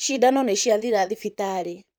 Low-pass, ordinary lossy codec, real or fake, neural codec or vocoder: none; none; fake; vocoder, 44.1 kHz, 128 mel bands, Pupu-Vocoder